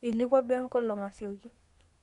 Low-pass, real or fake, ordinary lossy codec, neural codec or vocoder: 10.8 kHz; fake; none; codec, 24 kHz, 1 kbps, SNAC